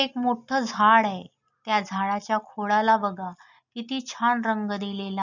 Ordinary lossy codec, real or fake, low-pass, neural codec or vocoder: none; real; 7.2 kHz; none